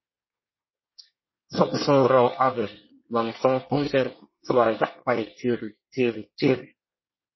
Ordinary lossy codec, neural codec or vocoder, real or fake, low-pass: MP3, 24 kbps; codec, 24 kHz, 1 kbps, SNAC; fake; 7.2 kHz